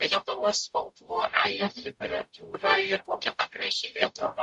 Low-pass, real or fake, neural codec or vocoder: 10.8 kHz; fake; codec, 44.1 kHz, 0.9 kbps, DAC